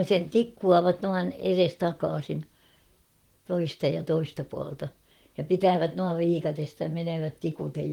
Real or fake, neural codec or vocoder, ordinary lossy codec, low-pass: fake; vocoder, 44.1 kHz, 128 mel bands, Pupu-Vocoder; Opus, 24 kbps; 19.8 kHz